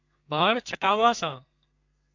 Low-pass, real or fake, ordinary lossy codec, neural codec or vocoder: 7.2 kHz; fake; AAC, 48 kbps; codec, 32 kHz, 1.9 kbps, SNAC